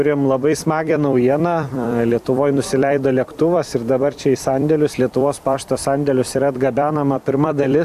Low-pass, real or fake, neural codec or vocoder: 14.4 kHz; fake; vocoder, 44.1 kHz, 128 mel bands every 256 samples, BigVGAN v2